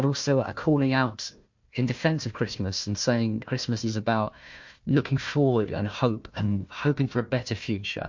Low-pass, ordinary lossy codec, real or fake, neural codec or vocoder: 7.2 kHz; MP3, 48 kbps; fake; codec, 16 kHz, 1 kbps, FunCodec, trained on Chinese and English, 50 frames a second